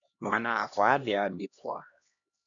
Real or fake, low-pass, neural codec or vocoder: fake; 7.2 kHz; codec, 16 kHz, 1 kbps, X-Codec, HuBERT features, trained on LibriSpeech